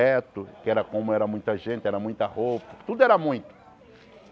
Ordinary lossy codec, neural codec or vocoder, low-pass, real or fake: none; none; none; real